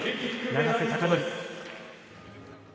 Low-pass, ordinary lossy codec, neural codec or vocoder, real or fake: none; none; none; real